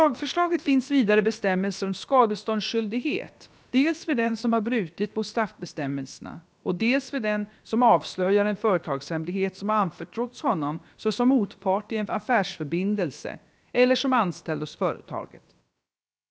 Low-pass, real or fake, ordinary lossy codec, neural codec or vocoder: none; fake; none; codec, 16 kHz, about 1 kbps, DyCAST, with the encoder's durations